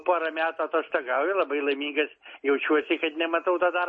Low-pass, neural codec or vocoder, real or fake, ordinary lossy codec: 9.9 kHz; none; real; MP3, 32 kbps